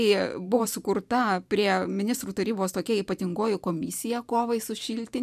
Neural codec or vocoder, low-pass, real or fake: vocoder, 44.1 kHz, 128 mel bands every 512 samples, BigVGAN v2; 14.4 kHz; fake